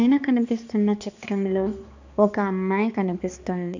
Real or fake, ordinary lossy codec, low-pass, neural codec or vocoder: fake; none; 7.2 kHz; codec, 16 kHz, 2 kbps, X-Codec, HuBERT features, trained on balanced general audio